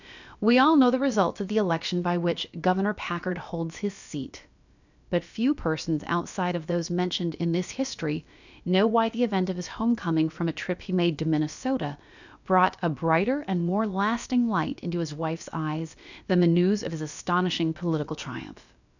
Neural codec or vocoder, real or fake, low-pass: codec, 16 kHz, about 1 kbps, DyCAST, with the encoder's durations; fake; 7.2 kHz